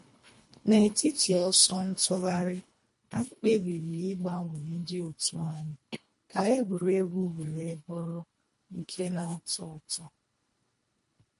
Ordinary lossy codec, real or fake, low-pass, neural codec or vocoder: MP3, 48 kbps; fake; 10.8 kHz; codec, 24 kHz, 1.5 kbps, HILCodec